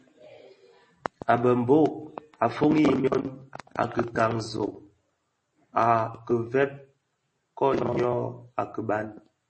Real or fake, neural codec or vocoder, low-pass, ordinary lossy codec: real; none; 10.8 kHz; MP3, 32 kbps